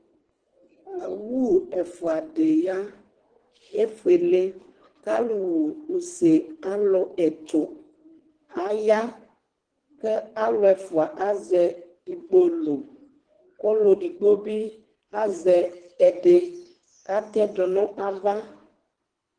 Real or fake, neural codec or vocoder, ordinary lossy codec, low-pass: fake; codec, 24 kHz, 3 kbps, HILCodec; Opus, 16 kbps; 9.9 kHz